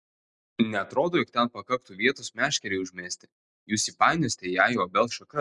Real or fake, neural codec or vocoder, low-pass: real; none; 10.8 kHz